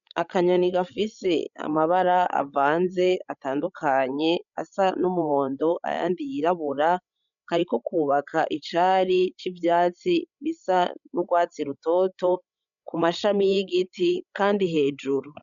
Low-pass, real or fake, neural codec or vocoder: 7.2 kHz; fake; codec, 16 kHz, 16 kbps, FreqCodec, larger model